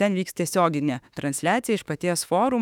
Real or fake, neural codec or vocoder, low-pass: fake; autoencoder, 48 kHz, 32 numbers a frame, DAC-VAE, trained on Japanese speech; 19.8 kHz